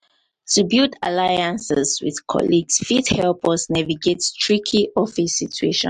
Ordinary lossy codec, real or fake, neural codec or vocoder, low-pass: MP3, 64 kbps; real; none; 10.8 kHz